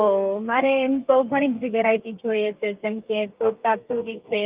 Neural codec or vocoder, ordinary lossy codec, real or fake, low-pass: codec, 32 kHz, 1.9 kbps, SNAC; Opus, 24 kbps; fake; 3.6 kHz